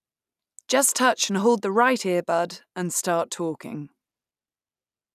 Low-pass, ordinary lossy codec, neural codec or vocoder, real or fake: 14.4 kHz; none; none; real